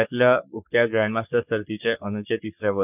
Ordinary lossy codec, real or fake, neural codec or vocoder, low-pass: none; fake; codec, 44.1 kHz, 3.4 kbps, Pupu-Codec; 3.6 kHz